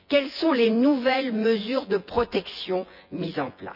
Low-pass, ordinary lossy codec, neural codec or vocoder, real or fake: 5.4 kHz; none; vocoder, 24 kHz, 100 mel bands, Vocos; fake